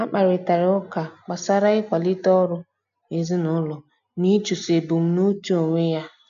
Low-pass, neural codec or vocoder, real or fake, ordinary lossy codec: 7.2 kHz; none; real; none